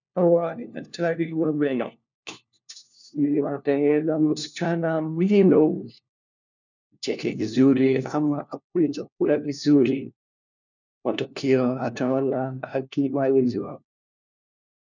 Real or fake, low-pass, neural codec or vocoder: fake; 7.2 kHz; codec, 16 kHz, 1 kbps, FunCodec, trained on LibriTTS, 50 frames a second